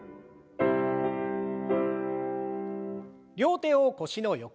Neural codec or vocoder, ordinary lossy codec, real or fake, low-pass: none; none; real; none